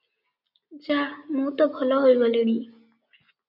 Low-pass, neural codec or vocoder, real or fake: 5.4 kHz; none; real